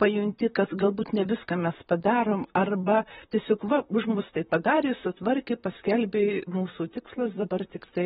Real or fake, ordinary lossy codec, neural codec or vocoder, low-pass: fake; AAC, 16 kbps; vocoder, 44.1 kHz, 128 mel bands, Pupu-Vocoder; 19.8 kHz